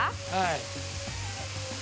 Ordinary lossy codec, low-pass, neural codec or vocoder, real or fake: none; none; none; real